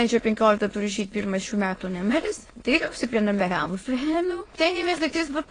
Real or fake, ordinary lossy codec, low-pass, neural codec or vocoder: fake; AAC, 32 kbps; 9.9 kHz; autoencoder, 22.05 kHz, a latent of 192 numbers a frame, VITS, trained on many speakers